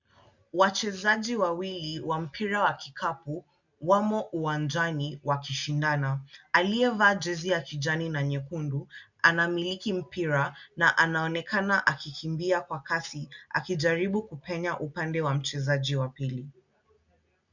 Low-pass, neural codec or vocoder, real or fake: 7.2 kHz; none; real